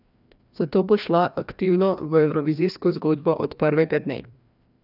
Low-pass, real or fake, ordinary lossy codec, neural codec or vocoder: 5.4 kHz; fake; none; codec, 16 kHz, 1 kbps, FreqCodec, larger model